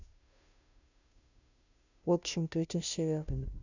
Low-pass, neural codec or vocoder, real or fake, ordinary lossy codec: 7.2 kHz; codec, 16 kHz, 0.5 kbps, FunCodec, trained on Chinese and English, 25 frames a second; fake; none